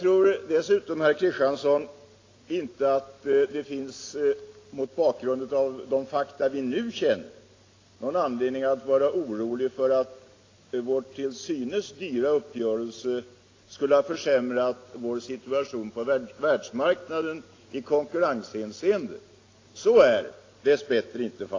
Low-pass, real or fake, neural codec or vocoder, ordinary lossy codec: 7.2 kHz; real; none; AAC, 32 kbps